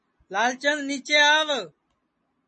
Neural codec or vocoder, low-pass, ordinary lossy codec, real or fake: none; 10.8 kHz; MP3, 32 kbps; real